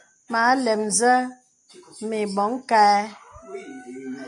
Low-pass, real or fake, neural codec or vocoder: 10.8 kHz; real; none